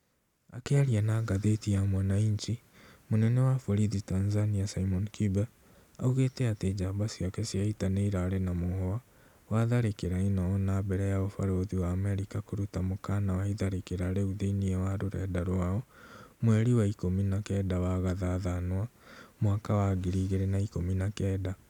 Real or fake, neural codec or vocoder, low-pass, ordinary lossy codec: real; none; 19.8 kHz; none